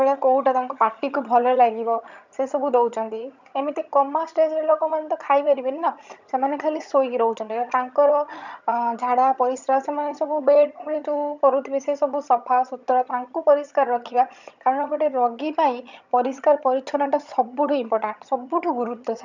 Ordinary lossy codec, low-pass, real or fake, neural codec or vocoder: none; 7.2 kHz; fake; vocoder, 22.05 kHz, 80 mel bands, HiFi-GAN